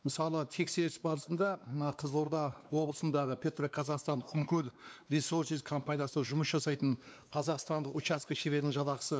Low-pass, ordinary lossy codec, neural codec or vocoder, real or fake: none; none; codec, 16 kHz, 2 kbps, X-Codec, WavLM features, trained on Multilingual LibriSpeech; fake